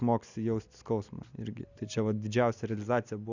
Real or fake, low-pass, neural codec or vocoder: real; 7.2 kHz; none